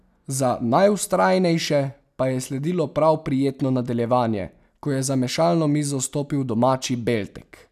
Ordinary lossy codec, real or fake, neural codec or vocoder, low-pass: none; real; none; 14.4 kHz